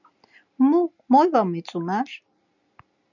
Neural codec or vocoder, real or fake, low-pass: none; real; 7.2 kHz